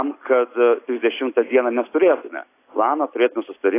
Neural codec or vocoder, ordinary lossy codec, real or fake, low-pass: none; AAC, 24 kbps; real; 3.6 kHz